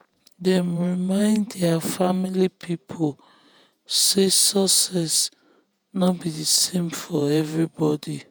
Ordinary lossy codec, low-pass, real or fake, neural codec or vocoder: none; none; fake; vocoder, 48 kHz, 128 mel bands, Vocos